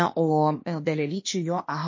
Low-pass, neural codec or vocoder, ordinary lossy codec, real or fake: 7.2 kHz; codec, 16 kHz, 0.8 kbps, ZipCodec; MP3, 32 kbps; fake